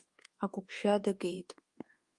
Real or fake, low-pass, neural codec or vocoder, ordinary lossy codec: fake; 10.8 kHz; codec, 24 kHz, 1.2 kbps, DualCodec; Opus, 24 kbps